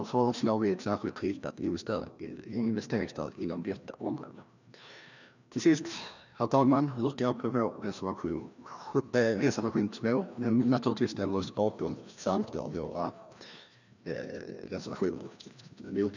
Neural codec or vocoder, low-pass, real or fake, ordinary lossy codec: codec, 16 kHz, 1 kbps, FreqCodec, larger model; 7.2 kHz; fake; none